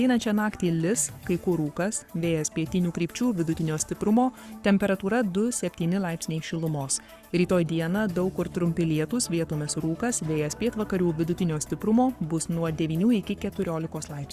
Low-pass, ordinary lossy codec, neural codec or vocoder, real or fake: 14.4 kHz; Opus, 64 kbps; codec, 44.1 kHz, 7.8 kbps, Pupu-Codec; fake